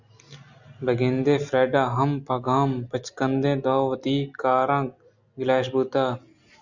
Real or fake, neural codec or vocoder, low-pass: real; none; 7.2 kHz